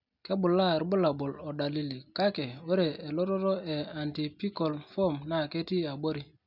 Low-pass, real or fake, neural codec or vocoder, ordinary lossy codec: 5.4 kHz; real; none; none